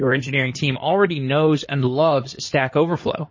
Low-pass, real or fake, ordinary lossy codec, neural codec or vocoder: 7.2 kHz; fake; MP3, 32 kbps; codec, 16 kHz in and 24 kHz out, 2.2 kbps, FireRedTTS-2 codec